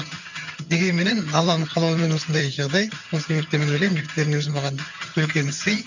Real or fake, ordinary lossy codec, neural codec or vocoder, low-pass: fake; none; vocoder, 22.05 kHz, 80 mel bands, HiFi-GAN; 7.2 kHz